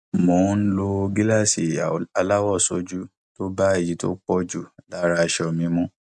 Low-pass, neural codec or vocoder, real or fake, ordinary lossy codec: none; none; real; none